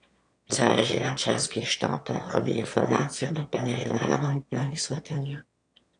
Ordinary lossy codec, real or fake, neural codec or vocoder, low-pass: AAC, 64 kbps; fake; autoencoder, 22.05 kHz, a latent of 192 numbers a frame, VITS, trained on one speaker; 9.9 kHz